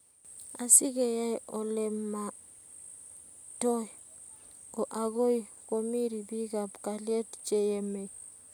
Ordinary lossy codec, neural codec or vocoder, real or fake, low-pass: none; none; real; none